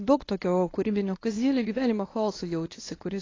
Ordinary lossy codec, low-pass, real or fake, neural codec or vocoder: AAC, 32 kbps; 7.2 kHz; fake; codec, 24 kHz, 0.9 kbps, WavTokenizer, medium speech release version 1